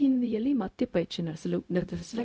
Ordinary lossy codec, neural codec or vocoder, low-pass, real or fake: none; codec, 16 kHz, 0.4 kbps, LongCat-Audio-Codec; none; fake